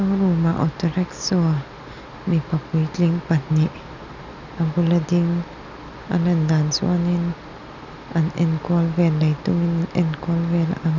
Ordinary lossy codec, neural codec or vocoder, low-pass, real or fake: none; none; 7.2 kHz; real